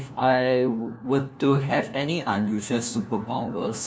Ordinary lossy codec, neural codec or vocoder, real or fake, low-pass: none; codec, 16 kHz, 1 kbps, FunCodec, trained on LibriTTS, 50 frames a second; fake; none